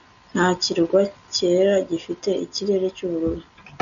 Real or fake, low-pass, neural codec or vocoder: real; 7.2 kHz; none